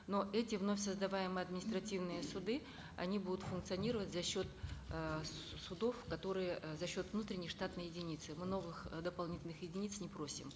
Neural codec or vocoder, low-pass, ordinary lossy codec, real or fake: none; none; none; real